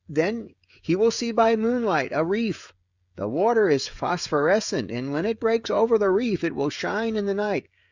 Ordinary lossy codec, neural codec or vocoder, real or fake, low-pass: Opus, 64 kbps; codec, 16 kHz, 16 kbps, FreqCodec, smaller model; fake; 7.2 kHz